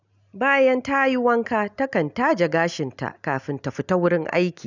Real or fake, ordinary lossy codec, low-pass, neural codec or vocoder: real; none; 7.2 kHz; none